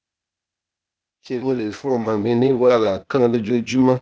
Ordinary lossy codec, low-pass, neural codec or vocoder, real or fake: none; none; codec, 16 kHz, 0.8 kbps, ZipCodec; fake